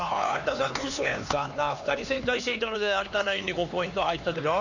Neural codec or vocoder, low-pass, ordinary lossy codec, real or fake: codec, 16 kHz, 2 kbps, X-Codec, HuBERT features, trained on LibriSpeech; 7.2 kHz; none; fake